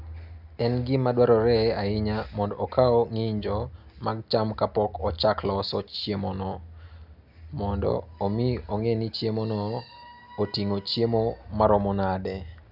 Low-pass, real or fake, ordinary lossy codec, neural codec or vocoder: 5.4 kHz; real; none; none